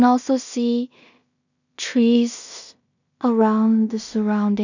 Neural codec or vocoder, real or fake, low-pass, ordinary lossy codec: codec, 16 kHz in and 24 kHz out, 0.4 kbps, LongCat-Audio-Codec, two codebook decoder; fake; 7.2 kHz; none